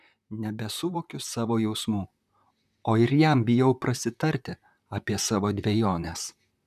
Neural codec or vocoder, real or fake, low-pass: vocoder, 44.1 kHz, 128 mel bands, Pupu-Vocoder; fake; 14.4 kHz